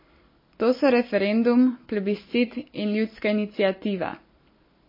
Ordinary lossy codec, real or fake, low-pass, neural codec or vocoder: MP3, 24 kbps; real; 5.4 kHz; none